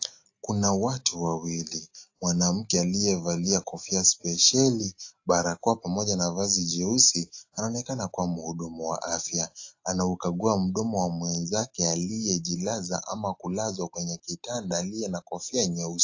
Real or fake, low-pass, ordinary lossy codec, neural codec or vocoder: real; 7.2 kHz; AAC, 48 kbps; none